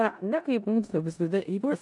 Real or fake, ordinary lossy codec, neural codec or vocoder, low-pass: fake; AAC, 64 kbps; codec, 16 kHz in and 24 kHz out, 0.4 kbps, LongCat-Audio-Codec, four codebook decoder; 10.8 kHz